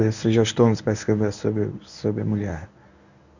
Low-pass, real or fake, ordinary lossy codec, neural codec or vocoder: 7.2 kHz; fake; none; codec, 24 kHz, 0.9 kbps, WavTokenizer, medium speech release version 1